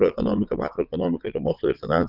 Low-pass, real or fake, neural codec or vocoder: 5.4 kHz; fake; vocoder, 22.05 kHz, 80 mel bands, Vocos